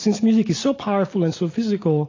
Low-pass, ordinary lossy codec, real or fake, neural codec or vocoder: 7.2 kHz; AAC, 32 kbps; real; none